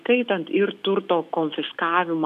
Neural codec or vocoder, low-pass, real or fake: autoencoder, 48 kHz, 128 numbers a frame, DAC-VAE, trained on Japanese speech; 14.4 kHz; fake